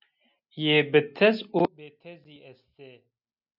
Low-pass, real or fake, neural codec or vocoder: 5.4 kHz; real; none